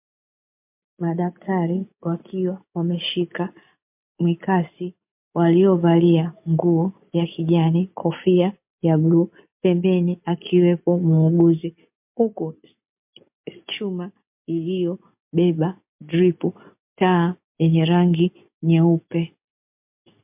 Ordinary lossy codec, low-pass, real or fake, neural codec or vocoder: MP3, 32 kbps; 3.6 kHz; real; none